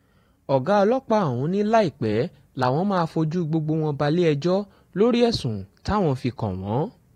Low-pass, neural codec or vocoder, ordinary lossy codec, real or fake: 19.8 kHz; vocoder, 44.1 kHz, 128 mel bands every 512 samples, BigVGAN v2; AAC, 48 kbps; fake